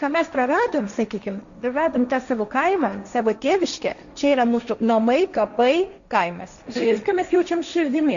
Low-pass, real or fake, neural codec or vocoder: 7.2 kHz; fake; codec, 16 kHz, 1.1 kbps, Voila-Tokenizer